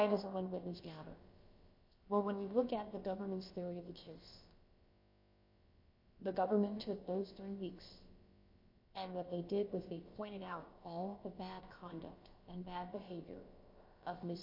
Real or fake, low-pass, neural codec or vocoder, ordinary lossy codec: fake; 5.4 kHz; codec, 16 kHz, about 1 kbps, DyCAST, with the encoder's durations; MP3, 32 kbps